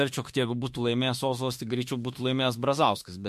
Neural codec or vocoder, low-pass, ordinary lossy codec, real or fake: autoencoder, 48 kHz, 32 numbers a frame, DAC-VAE, trained on Japanese speech; 14.4 kHz; MP3, 64 kbps; fake